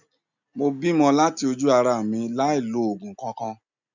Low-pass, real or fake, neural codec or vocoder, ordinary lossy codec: 7.2 kHz; real; none; none